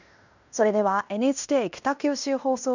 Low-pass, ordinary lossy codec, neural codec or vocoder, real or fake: 7.2 kHz; none; codec, 16 kHz in and 24 kHz out, 0.9 kbps, LongCat-Audio-Codec, fine tuned four codebook decoder; fake